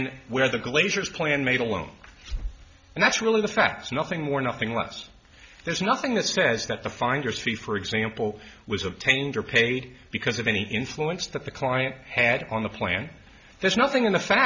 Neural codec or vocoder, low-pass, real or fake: none; 7.2 kHz; real